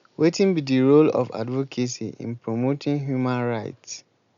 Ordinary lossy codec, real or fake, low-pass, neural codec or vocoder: MP3, 96 kbps; real; 7.2 kHz; none